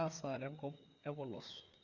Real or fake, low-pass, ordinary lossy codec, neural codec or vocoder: fake; 7.2 kHz; Opus, 64 kbps; codec, 16 kHz, 4 kbps, FreqCodec, larger model